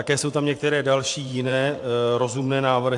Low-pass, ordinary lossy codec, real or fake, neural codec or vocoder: 10.8 kHz; AAC, 64 kbps; fake; vocoder, 44.1 kHz, 128 mel bands every 512 samples, BigVGAN v2